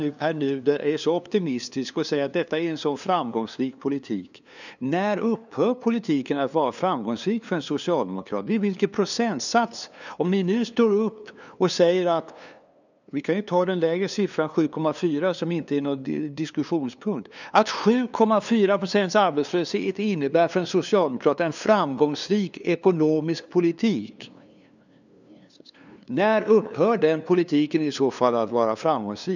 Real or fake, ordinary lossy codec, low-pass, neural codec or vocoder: fake; none; 7.2 kHz; codec, 16 kHz, 2 kbps, FunCodec, trained on LibriTTS, 25 frames a second